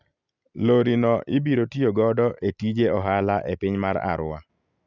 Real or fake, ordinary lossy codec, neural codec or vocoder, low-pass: real; none; none; 7.2 kHz